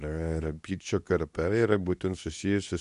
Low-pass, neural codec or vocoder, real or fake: 10.8 kHz; codec, 24 kHz, 0.9 kbps, WavTokenizer, medium speech release version 2; fake